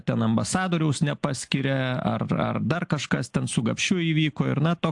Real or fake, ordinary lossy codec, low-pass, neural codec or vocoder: real; AAC, 64 kbps; 10.8 kHz; none